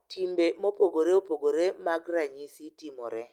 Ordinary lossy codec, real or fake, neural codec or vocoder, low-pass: Opus, 32 kbps; real; none; 19.8 kHz